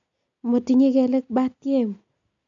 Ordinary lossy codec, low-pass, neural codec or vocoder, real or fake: none; 7.2 kHz; none; real